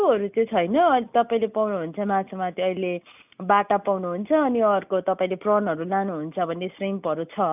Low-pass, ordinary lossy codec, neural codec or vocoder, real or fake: 3.6 kHz; none; none; real